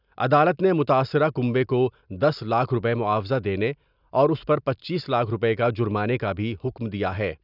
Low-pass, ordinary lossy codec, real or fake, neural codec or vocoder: 5.4 kHz; none; real; none